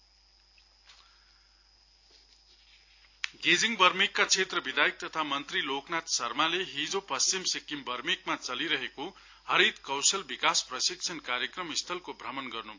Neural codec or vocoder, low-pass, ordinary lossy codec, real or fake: none; 7.2 kHz; AAC, 48 kbps; real